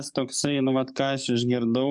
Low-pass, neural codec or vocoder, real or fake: 10.8 kHz; codec, 44.1 kHz, 7.8 kbps, DAC; fake